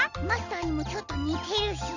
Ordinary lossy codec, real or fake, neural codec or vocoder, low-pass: none; real; none; 7.2 kHz